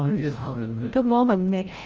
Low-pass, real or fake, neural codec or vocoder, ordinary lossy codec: 7.2 kHz; fake; codec, 16 kHz, 0.5 kbps, FreqCodec, larger model; Opus, 24 kbps